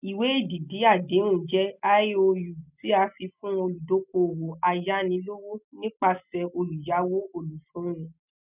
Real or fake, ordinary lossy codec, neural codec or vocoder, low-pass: real; none; none; 3.6 kHz